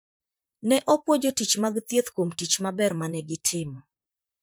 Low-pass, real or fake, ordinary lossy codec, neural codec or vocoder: none; fake; none; vocoder, 44.1 kHz, 128 mel bands, Pupu-Vocoder